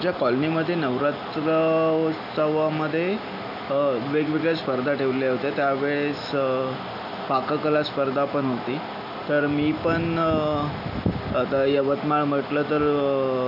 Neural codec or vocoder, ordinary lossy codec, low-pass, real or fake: none; none; 5.4 kHz; real